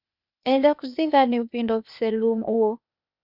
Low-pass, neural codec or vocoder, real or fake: 5.4 kHz; codec, 16 kHz, 0.8 kbps, ZipCodec; fake